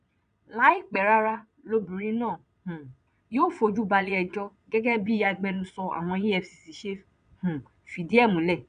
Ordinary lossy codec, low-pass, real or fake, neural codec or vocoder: none; 9.9 kHz; fake; vocoder, 22.05 kHz, 80 mel bands, Vocos